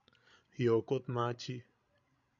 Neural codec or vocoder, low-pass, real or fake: codec, 16 kHz, 16 kbps, FreqCodec, larger model; 7.2 kHz; fake